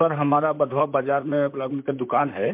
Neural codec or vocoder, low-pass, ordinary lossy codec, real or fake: vocoder, 44.1 kHz, 128 mel bands, Pupu-Vocoder; 3.6 kHz; MP3, 32 kbps; fake